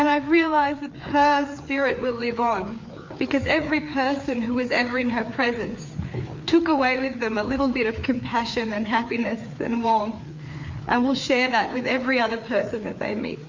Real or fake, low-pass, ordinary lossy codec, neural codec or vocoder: fake; 7.2 kHz; MP3, 48 kbps; codec, 16 kHz, 4 kbps, FreqCodec, larger model